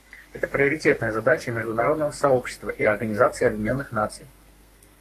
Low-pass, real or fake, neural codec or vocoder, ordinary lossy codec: 14.4 kHz; fake; codec, 32 kHz, 1.9 kbps, SNAC; AAC, 48 kbps